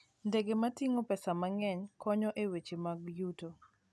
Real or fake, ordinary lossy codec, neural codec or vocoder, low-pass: real; none; none; none